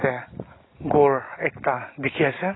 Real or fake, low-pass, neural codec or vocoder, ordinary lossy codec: real; 7.2 kHz; none; AAC, 16 kbps